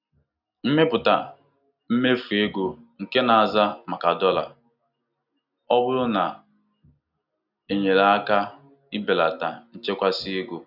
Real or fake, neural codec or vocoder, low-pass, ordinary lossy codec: real; none; 5.4 kHz; none